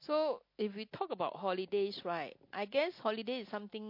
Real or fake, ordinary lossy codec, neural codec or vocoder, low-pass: real; MP3, 32 kbps; none; 5.4 kHz